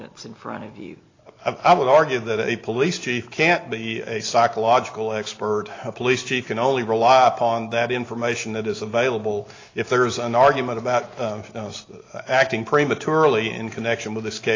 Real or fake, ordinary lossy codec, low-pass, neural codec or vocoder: real; AAC, 32 kbps; 7.2 kHz; none